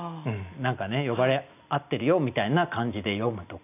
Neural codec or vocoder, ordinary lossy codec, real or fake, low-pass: none; none; real; 3.6 kHz